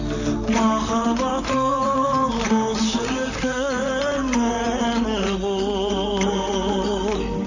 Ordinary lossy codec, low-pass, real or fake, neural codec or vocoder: none; 7.2 kHz; fake; vocoder, 44.1 kHz, 128 mel bands, Pupu-Vocoder